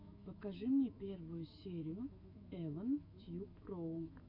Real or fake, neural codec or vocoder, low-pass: fake; autoencoder, 48 kHz, 128 numbers a frame, DAC-VAE, trained on Japanese speech; 5.4 kHz